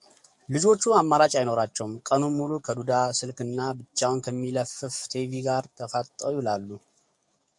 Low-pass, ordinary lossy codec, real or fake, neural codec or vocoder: 10.8 kHz; MP3, 96 kbps; fake; codec, 44.1 kHz, 7.8 kbps, DAC